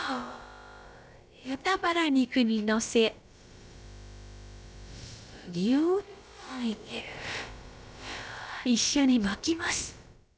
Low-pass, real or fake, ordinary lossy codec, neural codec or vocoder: none; fake; none; codec, 16 kHz, about 1 kbps, DyCAST, with the encoder's durations